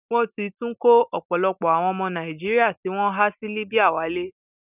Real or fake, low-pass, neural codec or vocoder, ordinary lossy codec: real; 3.6 kHz; none; none